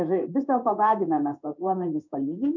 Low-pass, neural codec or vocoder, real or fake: 7.2 kHz; codec, 16 kHz in and 24 kHz out, 1 kbps, XY-Tokenizer; fake